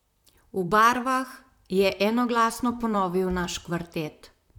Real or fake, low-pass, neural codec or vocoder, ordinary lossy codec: fake; 19.8 kHz; vocoder, 44.1 kHz, 128 mel bands, Pupu-Vocoder; none